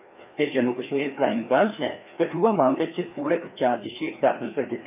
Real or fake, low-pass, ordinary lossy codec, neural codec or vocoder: fake; 3.6 kHz; AAC, 32 kbps; codec, 16 kHz, 2 kbps, FreqCodec, larger model